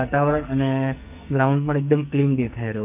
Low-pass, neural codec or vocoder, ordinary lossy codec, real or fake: 3.6 kHz; codec, 44.1 kHz, 2.6 kbps, SNAC; none; fake